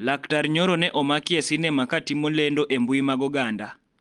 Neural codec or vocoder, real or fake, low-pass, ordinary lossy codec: none; real; 10.8 kHz; Opus, 24 kbps